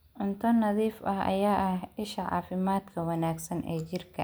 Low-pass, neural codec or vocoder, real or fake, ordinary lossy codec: none; none; real; none